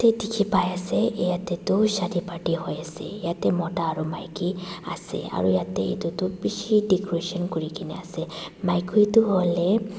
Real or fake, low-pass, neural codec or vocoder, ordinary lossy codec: real; none; none; none